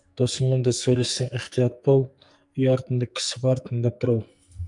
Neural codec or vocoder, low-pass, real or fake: codec, 44.1 kHz, 2.6 kbps, SNAC; 10.8 kHz; fake